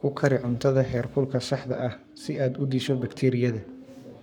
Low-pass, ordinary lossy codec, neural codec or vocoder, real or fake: 19.8 kHz; none; codec, 44.1 kHz, 7.8 kbps, Pupu-Codec; fake